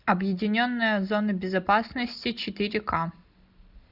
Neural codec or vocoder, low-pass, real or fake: none; 5.4 kHz; real